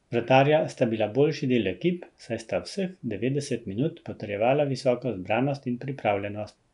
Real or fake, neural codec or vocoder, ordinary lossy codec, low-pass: real; none; none; 10.8 kHz